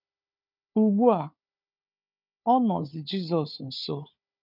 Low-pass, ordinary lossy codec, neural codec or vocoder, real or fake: 5.4 kHz; none; codec, 16 kHz, 4 kbps, FunCodec, trained on Chinese and English, 50 frames a second; fake